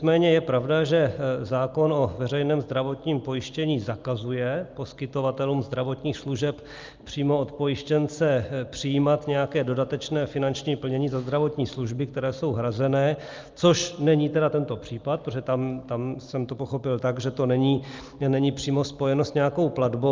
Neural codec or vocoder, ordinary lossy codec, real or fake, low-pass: none; Opus, 24 kbps; real; 7.2 kHz